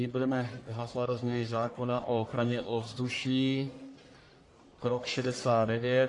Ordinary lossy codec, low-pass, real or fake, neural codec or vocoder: AAC, 48 kbps; 10.8 kHz; fake; codec, 44.1 kHz, 1.7 kbps, Pupu-Codec